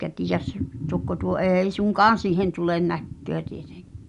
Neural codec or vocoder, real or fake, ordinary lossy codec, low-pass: none; real; none; 10.8 kHz